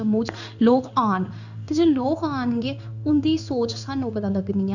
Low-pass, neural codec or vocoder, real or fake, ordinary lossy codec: 7.2 kHz; codec, 16 kHz in and 24 kHz out, 1 kbps, XY-Tokenizer; fake; none